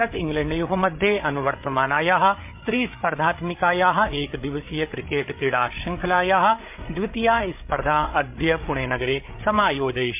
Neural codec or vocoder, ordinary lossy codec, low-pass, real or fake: codec, 16 kHz, 8 kbps, FreqCodec, larger model; MP3, 32 kbps; 3.6 kHz; fake